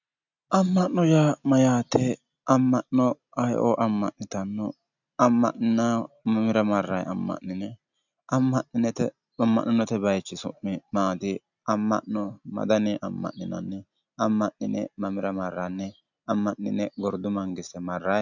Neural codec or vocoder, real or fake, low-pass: none; real; 7.2 kHz